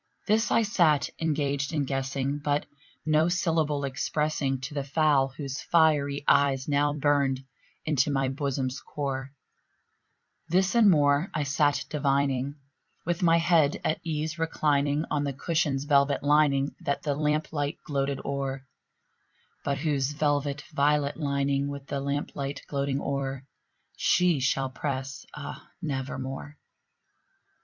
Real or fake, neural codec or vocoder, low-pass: fake; vocoder, 44.1 kHz, 128 mel bands every 256 samples, BigVGAN v2; 7.2 kHz